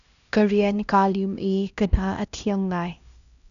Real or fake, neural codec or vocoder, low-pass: fake; codec, 16 kHz, 1 kbps, X-Codec, HuBERT features, trained on LibriSpeech; 7.2 kHz